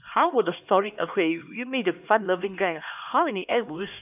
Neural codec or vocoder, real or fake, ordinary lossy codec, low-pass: codec, 16 kHz, 2 kbps, X-Codec, HuBERT features, trained on LibriSpeech; fake; none; 3.6 kHz